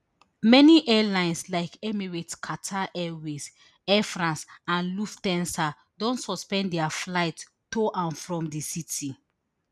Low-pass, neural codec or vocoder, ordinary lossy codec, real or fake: none; none; none; real